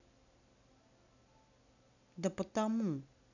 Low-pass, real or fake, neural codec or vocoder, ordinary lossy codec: 7.2 kHz; real; none; none